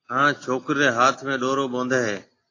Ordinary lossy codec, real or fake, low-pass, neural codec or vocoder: AAC, 32 kbps; real; 7.2 kHz; none